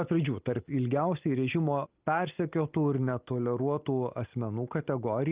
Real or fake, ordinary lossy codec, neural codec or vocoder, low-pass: real; Opus, 24 kbps; none; 3.6 kHz